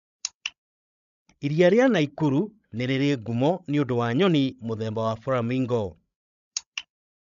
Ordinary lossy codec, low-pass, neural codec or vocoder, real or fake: none; 7.2 kHz; codec, 16 kHz, 16 kbps, FreqCodec, larger model; fake